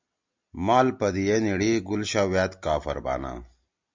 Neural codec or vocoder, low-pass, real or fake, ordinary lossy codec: none; 7.2 kHz; real; MP3, 64 kbps